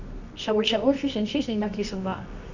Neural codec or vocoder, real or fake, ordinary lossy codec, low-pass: codec, 24 kHz, 0.9 kbps, WavTokenizer, medium music audio release; fake; none; 7.2 kHz